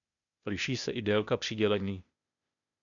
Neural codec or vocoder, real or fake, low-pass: codec, 16 kHz, 0.8 kbps, ZipCodec; fake; 7.2 kHz